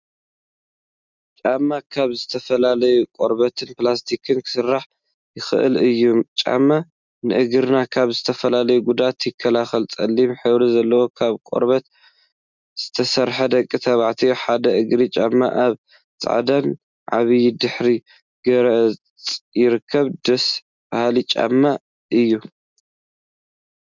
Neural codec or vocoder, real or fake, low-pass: none; real; 7.2 kHz